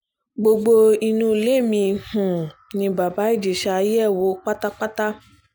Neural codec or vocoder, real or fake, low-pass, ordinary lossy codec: none; real; none; none